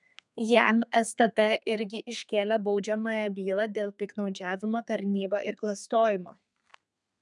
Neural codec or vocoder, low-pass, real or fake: codec, 32 kHz, 1.9 kbps, SNAC; 10.8 kHz; fake